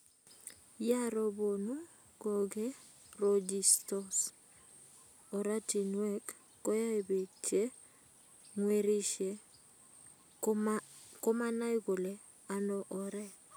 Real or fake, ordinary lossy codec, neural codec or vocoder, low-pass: real; none; none; none